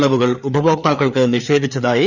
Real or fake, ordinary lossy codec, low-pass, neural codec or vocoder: fake; none; 7.2 kHz; codec, 16 kHz, 4 kbps, FreqCodec, larger model